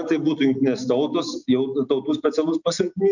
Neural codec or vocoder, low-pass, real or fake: none; 7.2 kHz; real